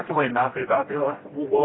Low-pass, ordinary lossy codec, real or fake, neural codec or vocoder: 7.2 kHz; AAC, 16 kbps; fake; codec, 16 kHz, 1 kbps, FreqCodec, smaller model